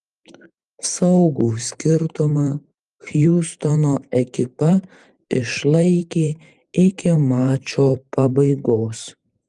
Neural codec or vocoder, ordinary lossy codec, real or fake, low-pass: vocoder, 44.1 kHz, 128 mel bands every 512 samples, BigVGAN v2; Opus, 32 kbps; fake; 10.8 kHz